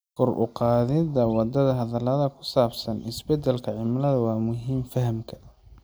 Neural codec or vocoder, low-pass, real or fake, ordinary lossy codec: none; none; real; none